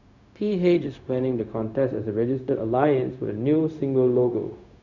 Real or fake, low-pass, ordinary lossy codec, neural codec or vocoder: fake; 7.2 kHz; none; codec, 16 kHz, 0.4 kbps, LongCat-Audio-Codec